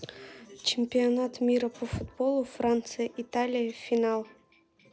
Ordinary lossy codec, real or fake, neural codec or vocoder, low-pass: none; real; none; none